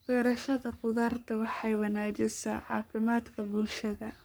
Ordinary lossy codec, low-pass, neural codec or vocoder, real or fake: none; none; codec, 44.1 kHz, 3.4 kbps, Pupu-Codec; fake